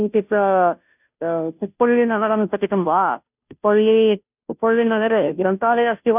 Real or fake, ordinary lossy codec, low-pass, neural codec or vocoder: fake; none; 3.6 kHz; codec, 16 kHz, 0.5 kbps, FunCodec, trained on Chinese and English, 25 frames a second